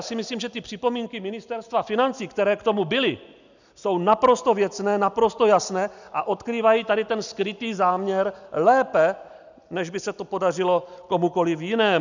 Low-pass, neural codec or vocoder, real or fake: 7.2 kHz; none; real